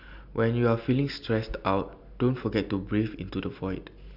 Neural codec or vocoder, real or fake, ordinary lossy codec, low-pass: none; real; none; 5.4 kHz